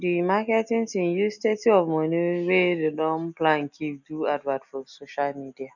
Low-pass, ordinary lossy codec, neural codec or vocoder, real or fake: 7.2 kHz; none; none; real